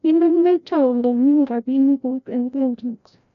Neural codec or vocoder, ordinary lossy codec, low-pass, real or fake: codec, 16 kHz, 0.5 kbps, FreqCodec, larger model; none; 7.2 kHz; fake